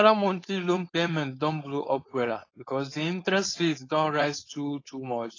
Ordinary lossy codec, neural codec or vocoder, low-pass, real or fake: AAC, 32 kbps; codec, 16 kHz, 4.8 kbps, FACodec; 7.2 kHz; fake